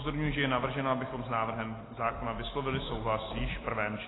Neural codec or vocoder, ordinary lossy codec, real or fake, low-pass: none; AAC, 16 kbps; real; 7.2 kHz